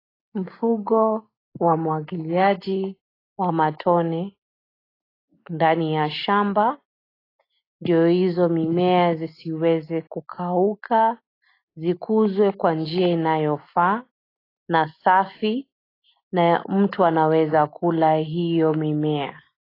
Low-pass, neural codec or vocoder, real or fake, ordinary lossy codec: 5.4 kHz; none; real; AAC, 24 kbps